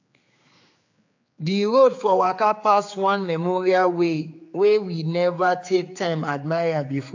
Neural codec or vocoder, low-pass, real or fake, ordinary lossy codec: codec, 16 kHz, 4 kbps, X-Codec, HuBERT features, trained on general audio; 7.2 kHz; fake; AAC, 48 kbps